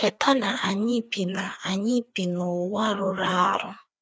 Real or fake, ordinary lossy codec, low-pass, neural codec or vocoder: fake; none; none; codec, 16 kHz, 2 kbps, FreqCodec, larger model